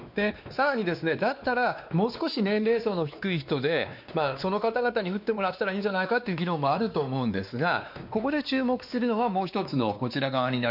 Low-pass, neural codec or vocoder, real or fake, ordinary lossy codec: 5.4 kHz; codec, 16 kHz, 2 kbps, X-Codec, WavLM features, trained on Multilingual LibriSpeech; fake; none